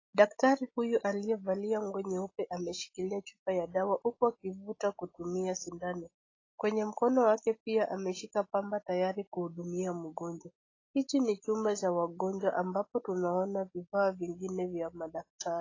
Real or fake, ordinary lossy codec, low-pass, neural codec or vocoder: real; AAC, 32 kbps; 7.2 kHz; none